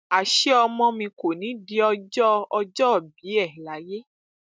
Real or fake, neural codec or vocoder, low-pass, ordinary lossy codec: real; none; none; none